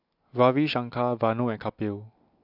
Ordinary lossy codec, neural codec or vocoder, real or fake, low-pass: AAC, 48 kbps; none; real; 5.4 kHz